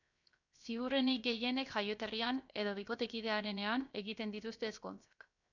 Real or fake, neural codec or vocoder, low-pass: fake; codec, 16 kHz, 0.7 kbps, FocalCodec; 7.2 kHz